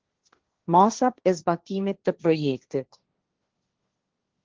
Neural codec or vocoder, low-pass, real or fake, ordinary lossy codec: codec, 16 kHz, 1.1 kbps, Voila-Tokenizer; 7.2 kHz; fake; Opus, 16 kbps